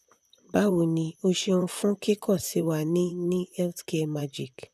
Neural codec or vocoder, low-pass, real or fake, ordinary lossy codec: vocoder, 44.1 kHz, 128 mel bands, Pupu-Vocoder; 14.4 kHz; fake; none